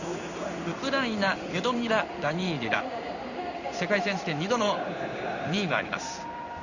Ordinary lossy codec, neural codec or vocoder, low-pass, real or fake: none; codec, 16 kHz in and 24 kHz out, 1 kbps, XY-Tokenizer; 7.2 kHz; fake